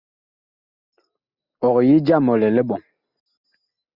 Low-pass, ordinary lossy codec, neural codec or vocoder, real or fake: 5.4 kHz; Opus, 64 kbps; none; real